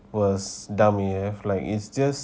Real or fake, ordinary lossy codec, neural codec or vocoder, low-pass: real; none; none; none